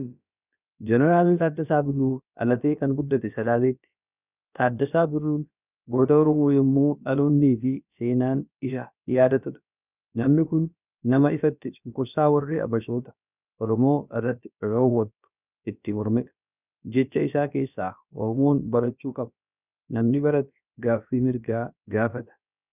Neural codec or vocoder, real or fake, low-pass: codec, 16 kHz, about 1 kbps, DyCAST, with the encoder's durations; fake; 3.6 kHz